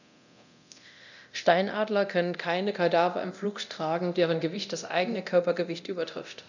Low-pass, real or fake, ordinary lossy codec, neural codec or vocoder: 7.2 kHz; fake; none; codec, 24 kHz, 0.9 kbps, DualCodec